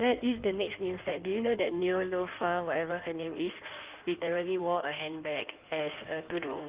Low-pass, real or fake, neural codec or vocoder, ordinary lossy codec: 3.6 kHz; fake; codec, 16 kHz in and 24 kHz out, 1.1 kbps, FireRedTTS-2 codec; Opus, 32 kbps